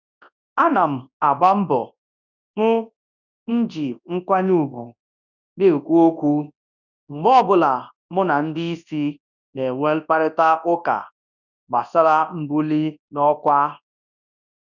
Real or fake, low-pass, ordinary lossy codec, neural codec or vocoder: fake; 7.2 kHz; none; codec, 24 kHz, 0.9 kbps, WavTokenizer, large speech release